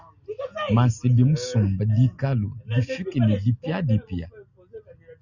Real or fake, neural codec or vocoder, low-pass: real; none; 7.2 kHz